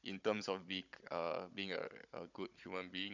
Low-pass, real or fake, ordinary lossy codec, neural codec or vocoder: 7.2 kHz; fake; none; codec, 16 kHz, 16 kbps, FunCodec, trained on Chinese and English, 50 frames a second